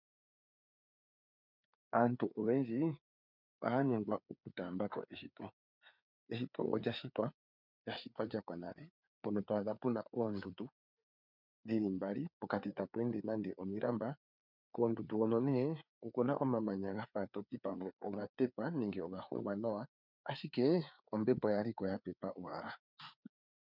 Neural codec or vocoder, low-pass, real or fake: codec, 16 kHz, 4 kbps, FreqCodec, larger model; 5.4 kHz; fake